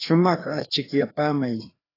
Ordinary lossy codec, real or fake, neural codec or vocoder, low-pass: AAC, 24 kbps; fake; codec, 16 kHz, 2 kbps, FreqCodec, larger model; 5.4 kHz